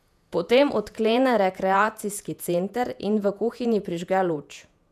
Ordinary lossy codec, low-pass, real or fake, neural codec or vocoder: none; 14.4 kHz; fake; vocoder, 48 kHz, 128 mel bands, Vocos